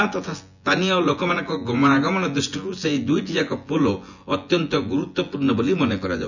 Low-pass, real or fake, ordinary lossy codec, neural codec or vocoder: 7.2 kHz; fake; none; vocoder, 24 kHz, 100 mel bands, Vocos